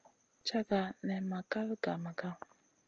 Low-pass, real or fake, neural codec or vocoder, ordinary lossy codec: 7.2 kHz; real; none; Opus, 24 kbps